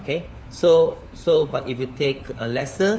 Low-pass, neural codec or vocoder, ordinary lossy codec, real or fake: none; codec, 16 kHz, 4 kbps, FunCodec, trained on LibriTTS, 50 frames a second; none; fake